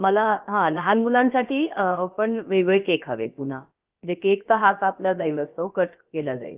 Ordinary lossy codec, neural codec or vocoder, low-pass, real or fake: Opus, 24 kbps; codec, 16 kHz, about 1 kbps, DyCAST, with the encoder's durations; 3.6 kHz; fake